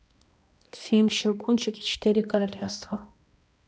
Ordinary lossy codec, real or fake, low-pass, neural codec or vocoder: none; fake; none; codec, 16 kHz, 1 kbps, X-Codec, HuBERT features, trained on balanced general audio